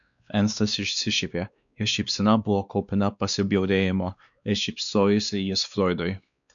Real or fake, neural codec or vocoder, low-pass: fake; codec, 16 kHz, 2 kbps, X-Codec, WavLM features, trained on Multilingual LibriSpeech; 7.2 kHz